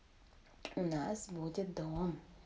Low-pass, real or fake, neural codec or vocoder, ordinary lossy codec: none; real; none; none